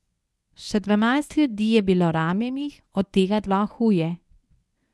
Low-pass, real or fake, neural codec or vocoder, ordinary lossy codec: none; fake; codec, 24 kHz, 0.9 kbps, WavTokenizer, medium speech release version 1; none